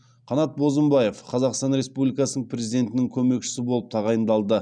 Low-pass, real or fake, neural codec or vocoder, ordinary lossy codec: 9.9 kHz; real; none; none